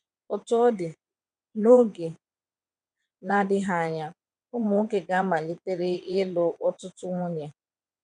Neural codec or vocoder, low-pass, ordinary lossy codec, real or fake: vocoder, 22.05 kHz, 80 mel bands, WaveNeXt; 9.9 kHz; none; fake